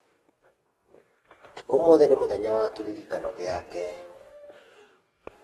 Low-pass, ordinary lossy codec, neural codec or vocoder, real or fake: 19.8 kHz; AAC, 32 kbps; codec, 44.1 kHz, 2.6 kbps, DAC; fake